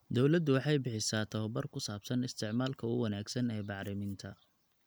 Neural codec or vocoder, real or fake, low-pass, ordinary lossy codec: none; real; none; none